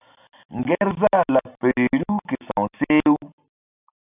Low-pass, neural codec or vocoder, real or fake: 3.6 kHz; none; real